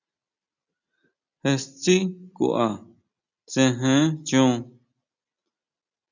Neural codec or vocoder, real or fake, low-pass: none; real; 7.2 kHz